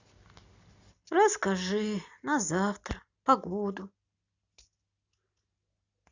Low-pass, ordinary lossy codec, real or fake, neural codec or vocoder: 7.2 kHz; Opus, 64 kbps; real; none